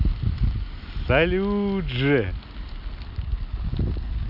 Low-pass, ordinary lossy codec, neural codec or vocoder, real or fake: 5.4 kHz; none; none; real